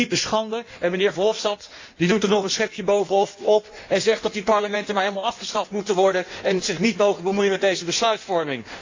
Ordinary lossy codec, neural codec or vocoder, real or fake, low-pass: AAC, 48 kbps; codec, 16 kHz in and 24 kHz out, 1.1 kbps, FireRedTTS-2 codec; fake; 7.2 kHz